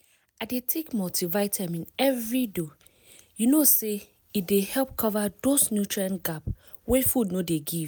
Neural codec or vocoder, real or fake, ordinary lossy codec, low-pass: none; real; none; none